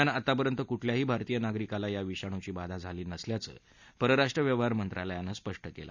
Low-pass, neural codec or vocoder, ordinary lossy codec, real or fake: 7.2 kHz; none; none; real